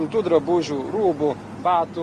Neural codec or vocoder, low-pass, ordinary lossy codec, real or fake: none; 10.8 kHz; Opus, 32 kbps; real